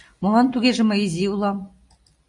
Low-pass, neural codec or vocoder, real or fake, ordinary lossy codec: 10.8 kHz; none; real; AAC, 64 kbps